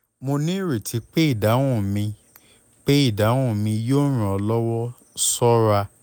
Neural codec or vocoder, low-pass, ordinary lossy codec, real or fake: none; none; none; real